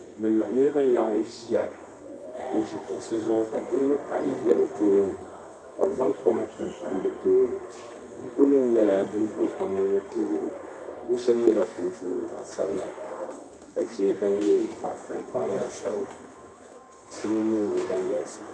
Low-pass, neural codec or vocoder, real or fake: 9.9 kHz; codec, 24 kHz, 0.9 kbps, WavTokenizer, medium music audio release; fake